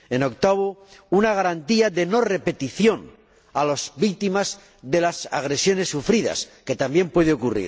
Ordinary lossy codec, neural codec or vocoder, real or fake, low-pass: none; none; real; none